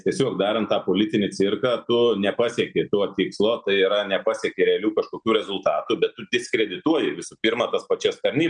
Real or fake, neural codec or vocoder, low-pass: real; none; 9.9 kHz